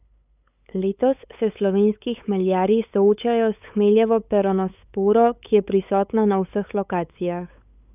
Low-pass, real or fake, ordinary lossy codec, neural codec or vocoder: 3.6 kHz; fake; none; codec, 16 kHz, 8 kbps, FunCodec, trained on LibriTTS, 25 frames a second